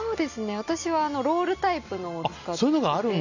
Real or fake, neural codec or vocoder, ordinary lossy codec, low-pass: real; none; none; 7.2 kHz